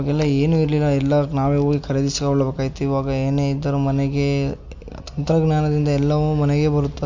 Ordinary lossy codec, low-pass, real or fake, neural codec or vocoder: MP3, 48 kbps; 7.2 kHz; real; none